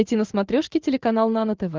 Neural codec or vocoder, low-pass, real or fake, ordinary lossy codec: none; 7.2 kHz; real; Opus, 32 kbps